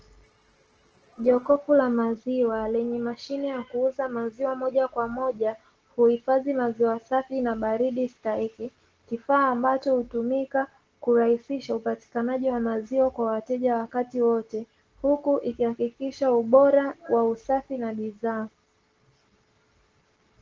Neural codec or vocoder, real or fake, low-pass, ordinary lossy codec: none; real; 7.2 kHz; Opus, 16 kbps